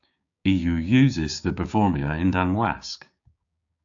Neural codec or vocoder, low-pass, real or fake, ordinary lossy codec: codec, 16 kHz, 6 kbps, DAC; 7.2 kHz; fake; AAC, 64 kbps